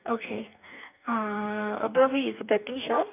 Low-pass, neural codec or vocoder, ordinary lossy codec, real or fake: 3.6 kHz; codec, 44.1 kHz, 2.6 kbps, DAC; none; fake